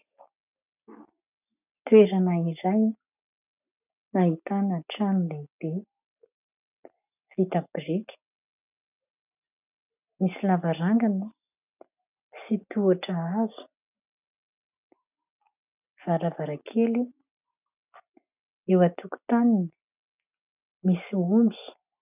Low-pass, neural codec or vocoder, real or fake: 3.6 kHz; none; real